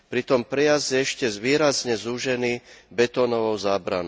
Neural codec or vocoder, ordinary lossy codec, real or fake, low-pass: none; none; real; none